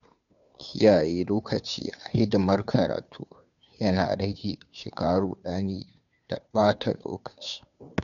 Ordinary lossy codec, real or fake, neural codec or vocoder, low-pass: none; fake; codec, 16 kHz, 2 kbps, FunCodec, trained on Chinese and English, 25 frames a second; 7.2 kHz